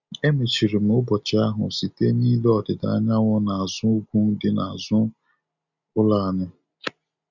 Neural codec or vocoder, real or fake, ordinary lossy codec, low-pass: none; real; none; 7.2 kHz